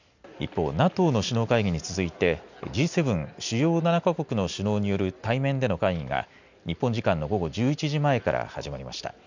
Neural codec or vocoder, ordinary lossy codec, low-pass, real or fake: none; none; 7.2 kHz; real